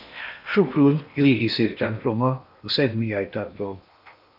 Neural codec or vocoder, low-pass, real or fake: codec, 16 kHz in and 24 kHz out, 0.8 kbps, FocalCodec, streaming, 65536 codes; 5.4 kHz; fake